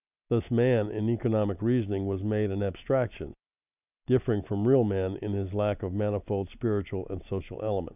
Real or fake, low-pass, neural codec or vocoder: real; 3.6 kHz; none